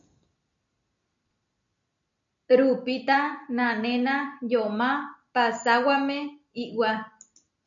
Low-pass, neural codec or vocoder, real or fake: 7.2 kHz; none; real